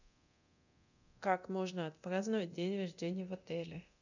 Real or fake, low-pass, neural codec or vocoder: fake; 7.2 kHz; codec, 24 kHz, 0.9 kbps, DualCodec